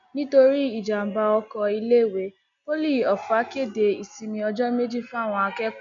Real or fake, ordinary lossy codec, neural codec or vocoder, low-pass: real; MP3, 96 kbps; none; 7.2 kHz